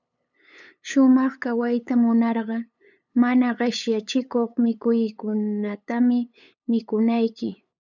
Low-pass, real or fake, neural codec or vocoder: 7.2 kHz; fake; codec, 16 kHz, 8 kbps, FunCodec, trained on LibriTTS, 25 frames a second